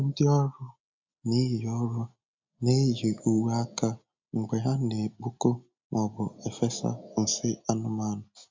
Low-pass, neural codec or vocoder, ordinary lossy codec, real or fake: 7.2 kHz; none; MP3, 64 kbps; real